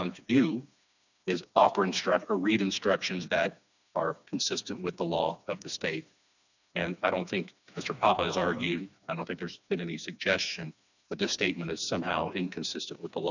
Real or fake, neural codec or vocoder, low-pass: fake; codec, 16 kHz, 2 kbps, FreqCodec, smaller model; 7.2 kHz